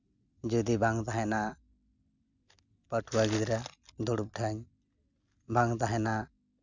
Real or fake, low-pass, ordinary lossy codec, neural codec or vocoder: real; 7.2 kHz; none; none